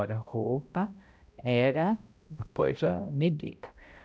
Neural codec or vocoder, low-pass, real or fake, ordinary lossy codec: codec, 16 kHz, 0.5 kbps, X-Codec, HuBERT features, trained on balanced general audio; none; fake; none